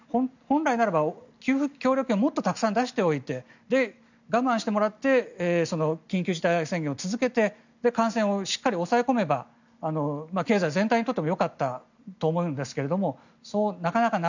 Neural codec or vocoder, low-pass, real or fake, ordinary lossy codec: none; 7.2 kHz; real; none